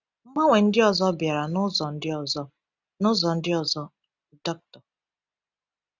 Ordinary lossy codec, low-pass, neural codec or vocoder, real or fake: none; 7.2 kHz; none; real